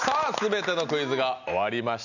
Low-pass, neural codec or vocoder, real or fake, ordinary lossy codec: 7.2 kHz; none; real; none